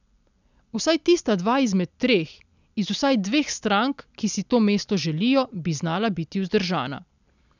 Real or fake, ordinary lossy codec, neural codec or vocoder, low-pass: real; none; none; 7.2 kHz